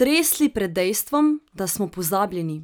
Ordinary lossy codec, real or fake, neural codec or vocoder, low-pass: none; real; none; none